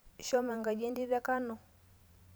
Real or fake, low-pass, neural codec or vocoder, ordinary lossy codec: fake; none; vocoder, 44.1 kHz, 128 mel bands every 512 samples, BigVGAN v2; none